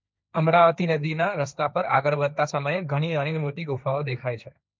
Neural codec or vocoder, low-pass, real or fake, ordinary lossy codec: codec, 16 kHz, 1.1 kbps, Voila-Tokenizer; none; fake; none